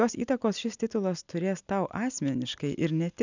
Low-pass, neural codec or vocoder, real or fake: 7.2 kHz; none; real